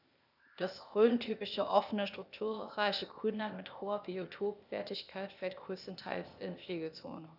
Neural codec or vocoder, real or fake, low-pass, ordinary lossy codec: codec, 16 kHz, 0.8 kbps, ZipCodec; fake; 5.4 kHz; none